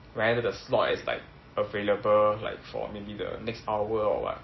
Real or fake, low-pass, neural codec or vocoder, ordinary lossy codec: real; 7.2 kHz; none; MP3, 24 kbps